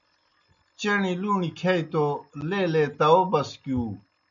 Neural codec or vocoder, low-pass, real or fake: none; 7.2 kHz; real